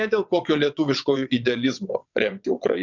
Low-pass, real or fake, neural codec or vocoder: 7.2 kHz; fake; vocoder, 22.05 kHz, 80 mel bands, WaveNeXt